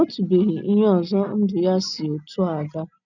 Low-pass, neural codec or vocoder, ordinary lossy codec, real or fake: 7.2 kHz; none; none; real